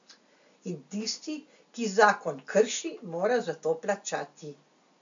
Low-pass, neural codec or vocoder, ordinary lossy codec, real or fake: 7.2 kHz; none; MP3, 64 kbps; real